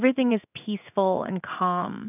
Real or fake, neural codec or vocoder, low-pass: fake; codec, 16 kHz in and 24 kHz out, 1 kbps, XY-Tokenizer; 3.6 kHz